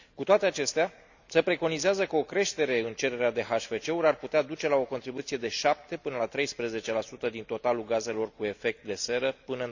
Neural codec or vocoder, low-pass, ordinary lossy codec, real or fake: none; 7.2 kHz; none; real